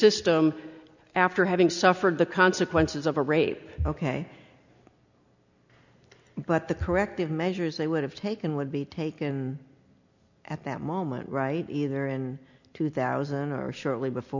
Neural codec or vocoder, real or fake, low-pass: none; real; 7.2 kHz